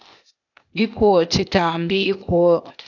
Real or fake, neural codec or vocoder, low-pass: fake; codec, 16 kHz, 0.8 kbps, ZipCodec; 7.2 kHz